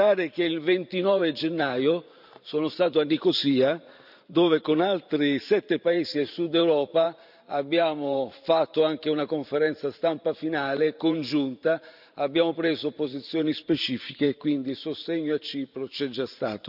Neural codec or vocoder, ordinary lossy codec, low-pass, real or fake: vocoder, 44.1 kHz, 128 mel bands every 512 samples, BigVGAN v2; none; 5.4 kHz; fake